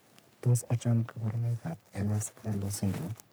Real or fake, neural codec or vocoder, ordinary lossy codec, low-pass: fake; codec, 44.1 kHz, 3.4 kbps, Pupu-Codec; none; none